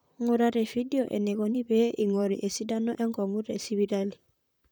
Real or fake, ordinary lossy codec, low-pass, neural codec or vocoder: fake; none; none; vocoder, 44.1 kHz, 128 mel bands, Pupu-Vocoder